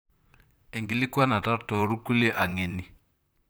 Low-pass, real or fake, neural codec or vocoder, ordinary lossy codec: none; fake; vocoder, 44.1 kHz, 128 mel bands, Pupu-Vocoder; none